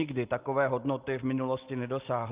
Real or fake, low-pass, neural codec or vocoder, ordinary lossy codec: real; 3.6 kHz; none; Opus, 24 kbps